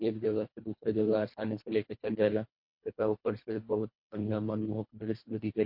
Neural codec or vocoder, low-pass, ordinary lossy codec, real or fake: codec, 24 kHz, 1.5 kbps, HILCodec; 5.4 kHz; MP3, 32 kbps; fake